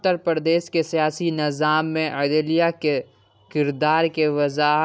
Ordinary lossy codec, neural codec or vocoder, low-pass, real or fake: none; none; none; real